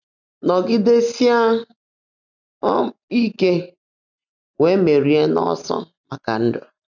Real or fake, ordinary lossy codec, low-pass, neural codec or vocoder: real; none; 7.2 kHz; none